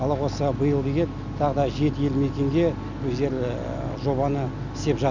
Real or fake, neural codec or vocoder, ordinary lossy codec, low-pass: real; none; none; 7.2 kHz